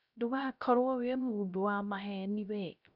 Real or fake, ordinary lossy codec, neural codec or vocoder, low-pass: fake; none; codec, 16 kHz, 0.3 kbps, FocalCodec; 5.4 kHz